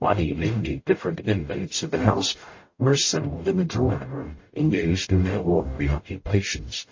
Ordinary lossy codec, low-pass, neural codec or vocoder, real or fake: MP3, 32 kbps; 7.2 kHz; codec, 44.1 kHz, 0.9 kbps, DAC; fake